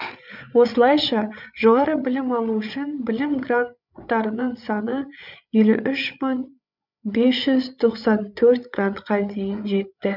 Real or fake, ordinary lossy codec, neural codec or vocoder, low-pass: fake; none; codec, 16 kHz, 8 kbps, FreqCodec, larger model; 5.4 kHz